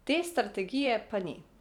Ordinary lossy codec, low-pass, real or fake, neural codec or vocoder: none; 19.8 kHz; fake; vocoder, 44.1 kHz, 128 mel bands every 512 samples, BigVGAN v2